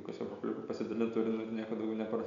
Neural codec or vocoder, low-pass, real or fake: none; 7.2 kHz; real